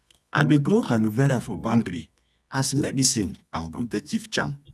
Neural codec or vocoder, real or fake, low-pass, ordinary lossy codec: codec, 24 kHz, 0.9 kbps, WavTokenizer, medium music audio release; fake; none; none